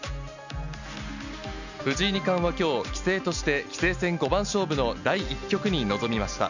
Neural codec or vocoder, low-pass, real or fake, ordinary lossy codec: none; 7.2 kHz; real; none